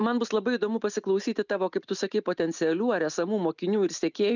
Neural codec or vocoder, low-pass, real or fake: none; 7.2 kHz; real